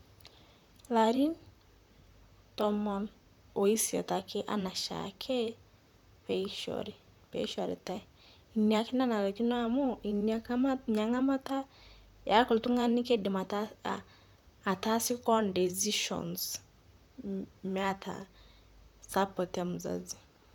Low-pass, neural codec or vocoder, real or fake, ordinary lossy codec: 19.8 kHz; vocoder, 44.1 kHz, 128 mel bands, Pupu-Vocoder; fake; none